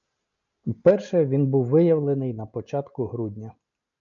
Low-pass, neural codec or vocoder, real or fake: 7.2 kHz; none; real